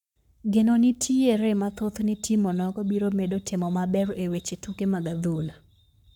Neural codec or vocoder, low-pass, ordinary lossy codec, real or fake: codec, 44.1 kHz, 7.8 kbps, Pupu-Codec; 19.8 kHz; none; fake